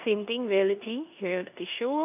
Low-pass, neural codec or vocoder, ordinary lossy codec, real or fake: 3.6 kHz; codec, 16 kHz in and 24 kHz out, 0.9 kbps, LongCat-Audio-Codec, four codebook decoder; AAC, 32 kbps; fake